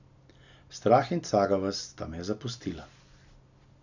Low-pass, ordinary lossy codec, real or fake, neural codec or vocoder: 7.2 kHz; none; real; none